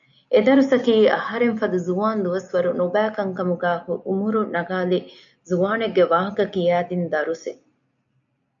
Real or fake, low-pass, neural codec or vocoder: real; 7.2 kHz; none